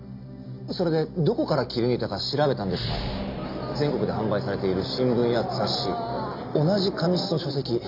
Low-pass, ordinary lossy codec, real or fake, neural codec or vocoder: 5.4 kHz; MP3, 48 kbps; real; none